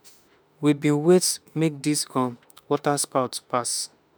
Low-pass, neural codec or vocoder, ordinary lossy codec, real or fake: none; autoencoder, 48 kHz, 32 numbers a frame, DAC-VAE, trained on Japanese speech; none; fake